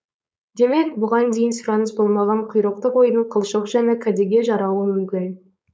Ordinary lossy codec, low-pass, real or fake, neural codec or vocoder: none; none; fake; codec, 16 kHz, 4.8 kbps, FACodec